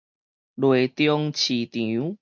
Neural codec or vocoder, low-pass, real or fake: none; 7.2 kHz; real